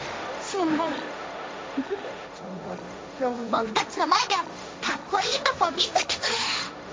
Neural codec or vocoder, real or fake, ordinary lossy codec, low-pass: codec, 16 kHz, 1.1 kbps, Voila-Tokenizer; fake; none; none